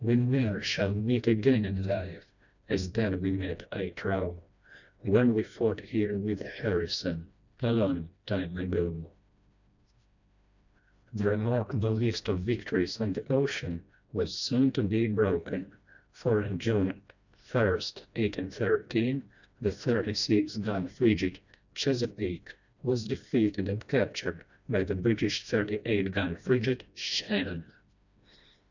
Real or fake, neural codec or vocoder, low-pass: fake; codec, 16 kHz, 1 kbps, FreqCodec, smaller model; 7.2 kHz